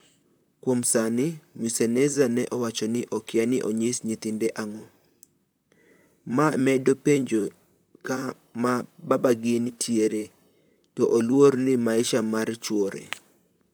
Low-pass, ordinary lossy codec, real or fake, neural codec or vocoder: none; none; fake; vocoder, 44.1 kHz, 128 mel bands, Pupu-Vocoder